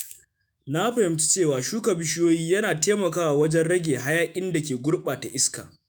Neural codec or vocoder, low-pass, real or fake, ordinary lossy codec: autoencoder, 48 kHz, 128 numbers a frame, DAC-VAE, trained on Japanese speech; none; fake; none